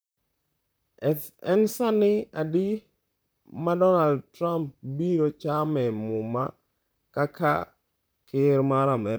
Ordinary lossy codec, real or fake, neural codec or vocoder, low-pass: none; fake; vocoder, 44.1 kHz, 128 mel bands, Pupu-Vocoder; none